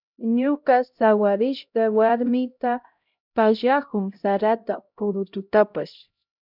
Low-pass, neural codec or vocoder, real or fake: 5.4 kHz; codec, 16 kHz, 0.5 kbps, X-Codec, HuBERT features, trained on LibriSpeech; fake